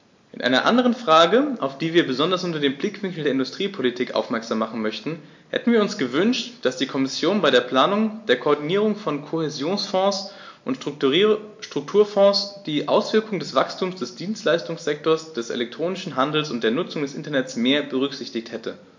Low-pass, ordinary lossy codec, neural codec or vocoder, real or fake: 7.2 kHz; MP3, 48 kbps; none; real